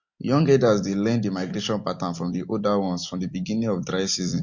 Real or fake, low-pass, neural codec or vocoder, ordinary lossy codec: real; 7.2 kHz; none; MP3, 48 kbps